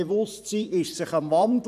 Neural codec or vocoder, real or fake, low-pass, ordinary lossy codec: vocoder, 44.1 kHz, 128 mel bands every 512 samples, BigVGAN v2; fake; 14.4 kHz; none